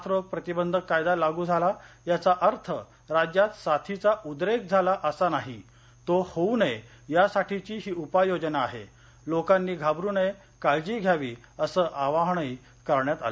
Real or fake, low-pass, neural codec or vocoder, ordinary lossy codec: real; none; none; none